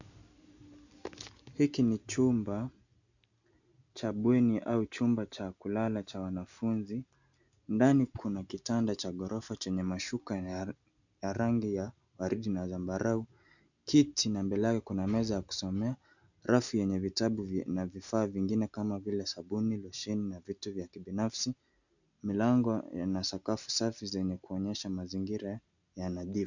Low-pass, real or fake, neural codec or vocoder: 7.2 kHz; real; none